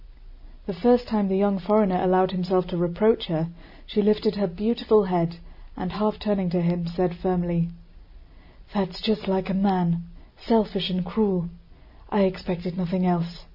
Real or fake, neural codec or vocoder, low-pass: real; none; 5.4 kHz